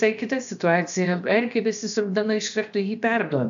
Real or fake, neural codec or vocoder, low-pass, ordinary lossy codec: fake; codec, 16 kHz, about 1 kbps, DyCAST, with the encoder's durations; 7.2 kHz; MP3, 64 kbps